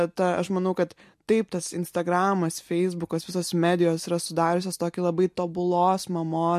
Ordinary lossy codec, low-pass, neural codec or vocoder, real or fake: MP3, 64 kbps; 14.4 kHz; none; real